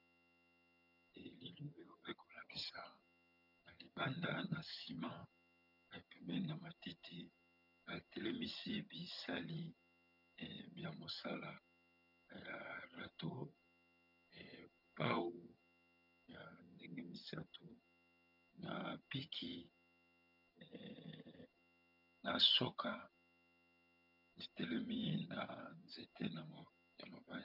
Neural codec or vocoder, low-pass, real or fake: vocoder, 22.05 kHz, 80 mel bands, HiFi-GAN; 5.4 kHz; fake